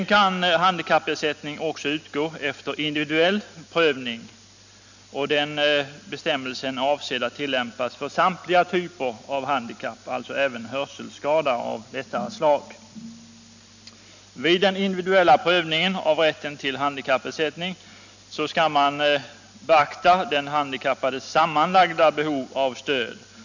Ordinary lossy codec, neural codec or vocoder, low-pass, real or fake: none; none; 7.2 kHz; real